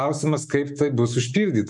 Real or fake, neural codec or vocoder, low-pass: fake; autoencoder, 48 kHz, 128 numbers a frame, DAC-VAE, trained on Japanese speech; 10.8 kHz